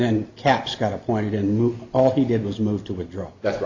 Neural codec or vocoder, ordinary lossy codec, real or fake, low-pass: none; Opus, 64 kbps; real; 7.2 kHz